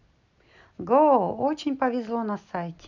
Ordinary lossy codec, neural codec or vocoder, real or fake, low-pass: none; none; real; 7.2 kHz